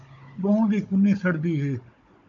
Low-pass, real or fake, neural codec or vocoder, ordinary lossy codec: 7.2 kHz; fake; codec, 16 kHz, 16 kbps, FunCodec, trained on Chinese and English, 50 frames a second; MP3, 48 kbps